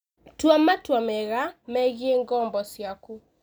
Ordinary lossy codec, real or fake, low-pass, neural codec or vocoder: none; real; none; none